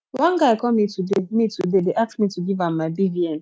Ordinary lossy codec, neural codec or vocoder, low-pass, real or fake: none; none; none; real